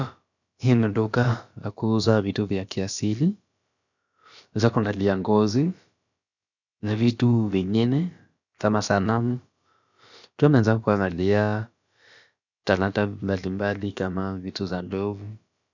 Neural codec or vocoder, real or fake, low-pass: codec, 16 kHz, about 1 kbps, DyCAST, with the encoder's durations; fake; 7.2 kHz